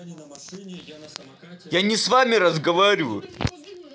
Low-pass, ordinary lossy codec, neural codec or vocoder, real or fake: none; none; none; real